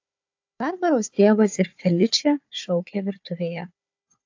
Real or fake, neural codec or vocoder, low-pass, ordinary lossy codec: fake; codec, 16 kHz, 4 kbps, FunCodec, trained on Chinese and English, 50 frames a second; 7.2 kHz; AAC, 48 kbps